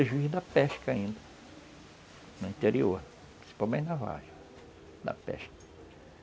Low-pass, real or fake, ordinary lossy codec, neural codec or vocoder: none; real; none; none